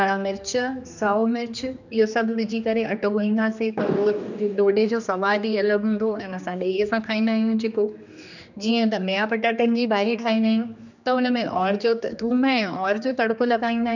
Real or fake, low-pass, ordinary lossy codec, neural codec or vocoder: fake; 7.2 kHz; none; codec, 16 kHz, 2 kbps, X-Codec, HuBERT features, trained on general audio